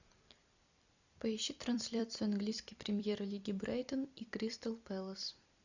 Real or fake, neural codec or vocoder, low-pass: fake; vocoder, 44.1 kHz, 128 mel bands every 256 samples, BigVGAN v2; 7.2 kHz